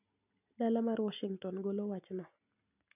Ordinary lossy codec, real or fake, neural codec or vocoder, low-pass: none; real; none; 3.6 kHz